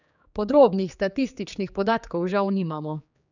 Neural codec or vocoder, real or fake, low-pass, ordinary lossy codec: codec, 16 kHz, 4 kbps, X-Codec, HuBERT features, trained on general audio; fake; 7.2 kHz; none